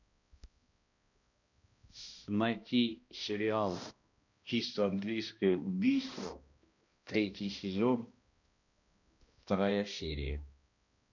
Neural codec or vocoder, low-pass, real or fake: codec, 16 kHz, 1 kbps, X-Codec, HuBERT features, trained on balanced general audio; 7.2 kHz; fake